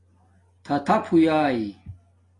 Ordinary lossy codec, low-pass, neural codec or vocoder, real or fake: AAC, 32 kbps; 10.8 kHz; none; real